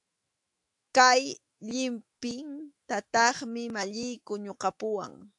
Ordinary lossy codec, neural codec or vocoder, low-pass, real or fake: AAC, 64 kbps; autoencoder, 48 kHz, 128 numbers a frame, DAC-VAE, trained on Japanese speech; 10.8 kHz; fake